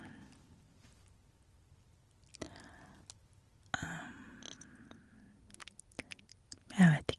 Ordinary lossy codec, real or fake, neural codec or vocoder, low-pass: Opus, 24 kbps; real; none; 10.8 kHz